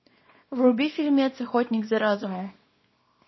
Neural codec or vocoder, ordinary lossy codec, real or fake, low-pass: codec, 24 kHz, 0.9 kbps, WavTokenizer, small release; MP3, 24 kbps; fake; 7.2 kHz